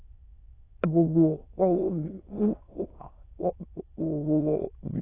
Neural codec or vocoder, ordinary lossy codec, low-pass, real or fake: autoencoder, 22.05 kHz, a latent of 192 numbers a frame, VITS, trained on many speakers; AAC, 16 kbps; 3.6 kHz; fake